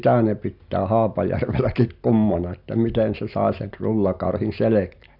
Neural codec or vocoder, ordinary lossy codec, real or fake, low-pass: none; none; real; 5.4 kHz